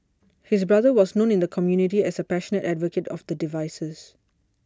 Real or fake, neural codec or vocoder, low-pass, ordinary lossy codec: real; none; none; none